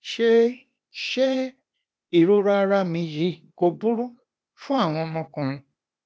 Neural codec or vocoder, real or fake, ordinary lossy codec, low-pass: codec, 16 kHz, 0.8 kbps, ZipCodec; fake; none; none